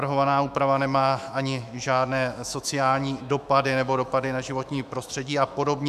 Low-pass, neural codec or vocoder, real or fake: 14.4 kHz; autoencoder, 48 kHz, 128 numbers a frame, DAC-VAE, trained on Japanese speech; fake